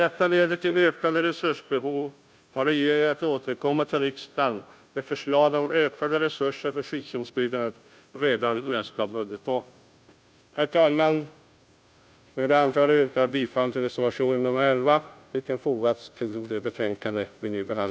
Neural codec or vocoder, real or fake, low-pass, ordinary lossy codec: codec, 16 kHz, 0.5 kbps, FunCodec, trained on Chinese and English, 25 frames a second; fake; none; none